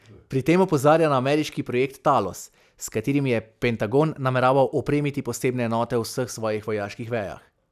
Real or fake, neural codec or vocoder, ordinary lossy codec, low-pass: real; none; none; 14.4 kHz